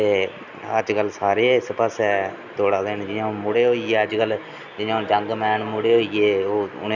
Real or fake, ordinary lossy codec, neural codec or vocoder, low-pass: real; none; none; 7.2 kHz